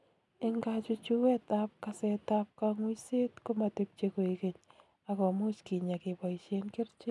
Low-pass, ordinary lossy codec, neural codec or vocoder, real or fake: none; none; none; real